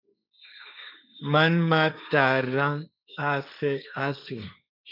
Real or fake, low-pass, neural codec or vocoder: fake; 5.4 kHz; codec, 16 kHz, 1.1 kbps, Voila-Tokenizer